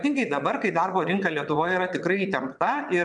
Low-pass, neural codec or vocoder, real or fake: 9.9 kHz; vocoder, 22.05 kHz, 80 mel bands, WaveNeXt; fake